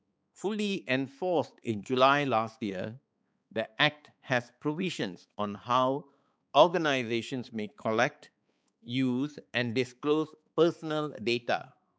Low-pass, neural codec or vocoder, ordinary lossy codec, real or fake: none; codec, 16 kHz, 4 kbps, X-Codec, HuBERT features, trained on balanced general audio; none; fake